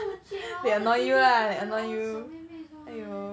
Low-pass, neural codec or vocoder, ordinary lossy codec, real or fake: none; none; none; real